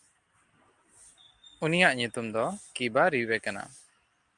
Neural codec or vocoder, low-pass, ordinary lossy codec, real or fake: none; 10.8 kHz; Opus, 32 kbps; real